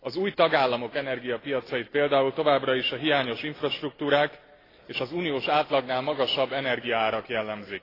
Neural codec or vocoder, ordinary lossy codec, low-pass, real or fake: none; AAC, 24 kbps; 5.4 kHz; real